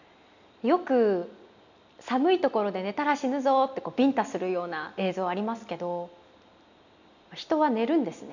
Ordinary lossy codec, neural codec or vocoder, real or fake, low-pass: none; none; real; 7.2 kHz